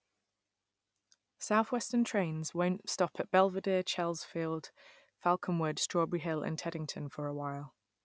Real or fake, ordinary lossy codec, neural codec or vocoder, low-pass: real; none; none; none